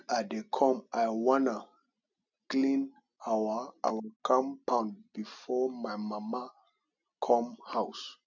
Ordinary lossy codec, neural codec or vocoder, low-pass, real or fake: none; none; 7.2 kHz; real